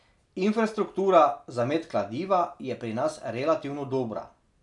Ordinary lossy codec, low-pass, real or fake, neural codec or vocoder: none; 10.8 kHz; real; none